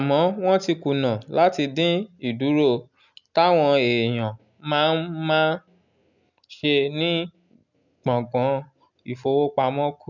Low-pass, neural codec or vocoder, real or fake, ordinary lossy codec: 7.2 kHz; none; real; none